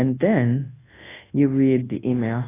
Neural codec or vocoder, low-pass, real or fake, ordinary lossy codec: codec, 24 kHz, 0.9 kbps, WavTokenizer, large speech release; 3.6 kHz; fake; AAC, 16 kbps